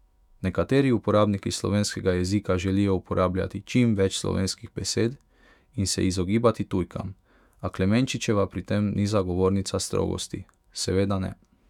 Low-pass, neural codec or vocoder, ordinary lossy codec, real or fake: 19.8 kHz; autoencoder, 48 kHz, 128 numbers a frame, DAC-VAE, trained on Japanese speech; none; fake